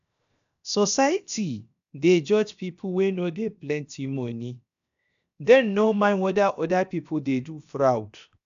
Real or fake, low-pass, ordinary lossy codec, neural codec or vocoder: fake; 7.2 kHz; AAC, 96 kbps; codec, 16 kHz, 0.7 kbps, FocalCodec